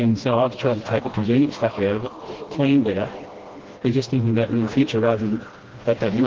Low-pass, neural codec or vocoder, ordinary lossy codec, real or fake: 7.2 kHz; codec, 16 kHz, 1 kbps, FreqCodec, smaller model; Opus, 16 kbps; fake